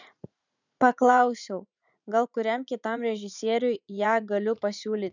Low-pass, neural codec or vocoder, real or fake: 7.2 kHz; vocoder, 44.1 kHz, 80 mel bands, Vocos; fake